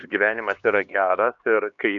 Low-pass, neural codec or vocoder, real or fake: 7.2 kHz; codec, 16 kHz, 2 kbps, X-Codec, HuBERT features, trained on LibriSpeech; fake